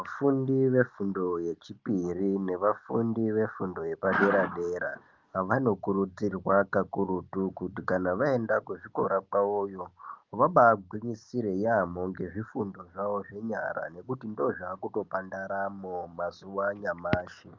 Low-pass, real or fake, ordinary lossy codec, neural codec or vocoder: 7.2 kHz; real; Opus, 24 kbps; none